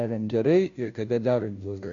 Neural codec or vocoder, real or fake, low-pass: codec, 16 kHz, 0.5 kbps, FunCodec, trained on Chinese and English, 25 frames a second; fake; 7.2 kHz